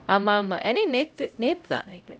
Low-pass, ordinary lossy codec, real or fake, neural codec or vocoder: none; none; fake; codec, 16 kHz, 0.5 kbps, X-Codec, HuBERT features, trained on LibriSpeech